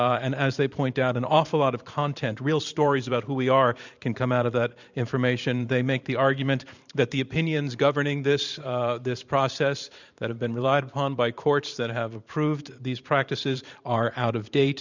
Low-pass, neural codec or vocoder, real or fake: 7.2 kHz; vocoder, 44.1 kHz, 128 mel bands every 512 samples, BigVGAN v2; fake